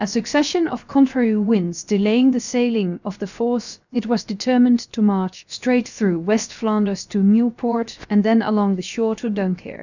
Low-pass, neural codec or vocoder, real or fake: 7.2 kHz; codec, 16 kHz, 0.7 kbps, FocalCodec; fake